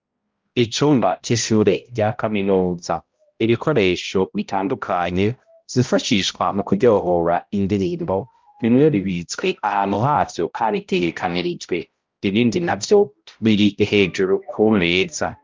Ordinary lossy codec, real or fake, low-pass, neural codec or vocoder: Opus, 24 kbps; fake; 7.2 kHz; codec, 16 kHz, 0.5 kbps, X-Codec, HuBERT features, trained on balanced general audio